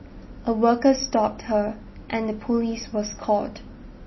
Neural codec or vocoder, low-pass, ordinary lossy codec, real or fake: none; 7.2 kHz; MP3, 24 kbps; real